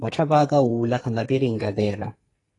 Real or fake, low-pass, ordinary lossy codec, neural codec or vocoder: fake; 10.8 kHz; AAC, 32 kbps; codec, 44.1 kHz, 2.6 kbps, SNAC